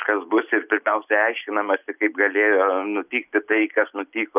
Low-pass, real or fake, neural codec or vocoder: 3.6 kHz; real; none